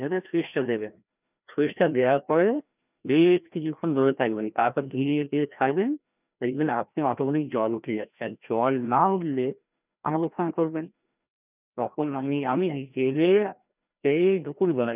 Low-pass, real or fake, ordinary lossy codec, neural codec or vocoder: 3.6 kHz; fake; none; codec, 16 kHz, 1 kbps, FreqCodec, larger model